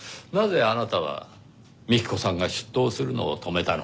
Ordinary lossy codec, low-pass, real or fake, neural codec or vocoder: none; none; real; none